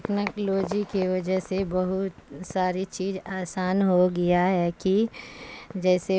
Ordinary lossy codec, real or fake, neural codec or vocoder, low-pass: none; real; none; none